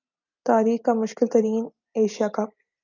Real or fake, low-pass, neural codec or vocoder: real; 7.2 kHz; none